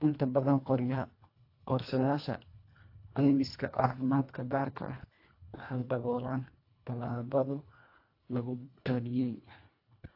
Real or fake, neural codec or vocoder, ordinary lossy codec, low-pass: fake; codec, 24 kHz, 1.5 kbps, HILCodec; AAC, 32 kbps; 5.4 kHz